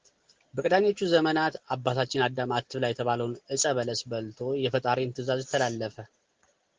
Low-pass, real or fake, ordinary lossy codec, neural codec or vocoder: 7.2 kHz; real; Opus, 16 kbps; none